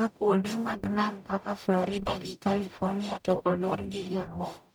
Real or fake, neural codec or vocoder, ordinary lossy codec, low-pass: fake; codec, 44.1 kHz, 0.9 kbps, DAC; none; none